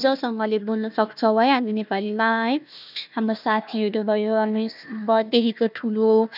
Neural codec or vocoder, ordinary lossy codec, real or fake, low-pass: codec, 16 kHz, 1 kbps, FunCodec, trained on Chinese and English, 50 frames a second; none; fake; 5.4 kHz